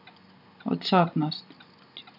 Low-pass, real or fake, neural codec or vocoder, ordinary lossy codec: 5.4 kHz; real; none; none